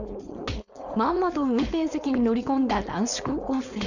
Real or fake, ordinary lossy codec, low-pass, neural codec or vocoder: fake; none; 7.2 kHz; codec, 16 kHz, 4.8 kbps, FACodec